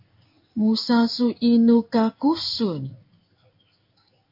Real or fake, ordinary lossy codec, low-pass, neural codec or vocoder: fake; AAC, 48 kbps; 5.4 kHz; codec, 16 kHz in and 24 kHz out, 1 kbps, XY-Tokenizer